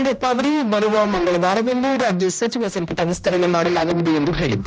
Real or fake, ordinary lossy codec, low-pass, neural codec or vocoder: fake; none; none; codec, 16 kHz, 1 kbps, X-Codec, HuBERT features, trained on general audio